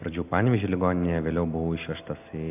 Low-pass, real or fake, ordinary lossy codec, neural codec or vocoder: 3.6 kHz; real; AAC, 32 kbps; none